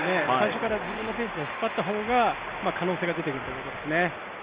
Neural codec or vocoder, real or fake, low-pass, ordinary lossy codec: none; real; 3.6 kHz; Opus, 32 kbps